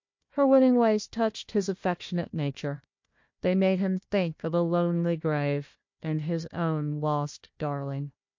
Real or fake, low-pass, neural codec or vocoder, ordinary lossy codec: fake; 7.2 kHz; codec, 16 kHz, 1 kbps, FunCodec, trained on Chinese and English, 50 frames a second; MP3, 48 kbps